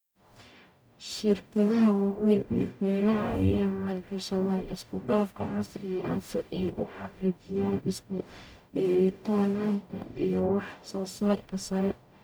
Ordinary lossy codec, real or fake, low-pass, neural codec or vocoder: none; fake; none; codec, 44.1 kHz, 0.9 kbps, DAC